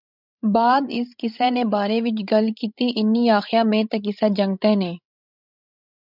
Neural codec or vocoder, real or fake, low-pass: codec, 16 kHz, 16 kbps, FreqCodec, larger model; fake; 5.4 kHz